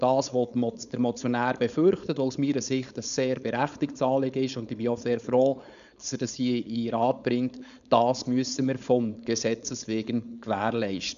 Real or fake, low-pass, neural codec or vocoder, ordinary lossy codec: fake; 7.2 kHz; codec, 16 kHz, 4.8 kbps, FACodec; none